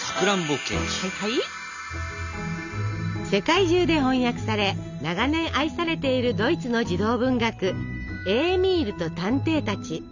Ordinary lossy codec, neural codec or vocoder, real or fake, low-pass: none; none; real; 7.2 kHz